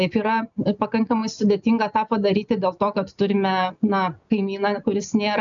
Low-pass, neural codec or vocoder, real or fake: 7.2 kHz; none; real